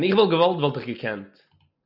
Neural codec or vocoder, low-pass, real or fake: none; 5.4 kHz; real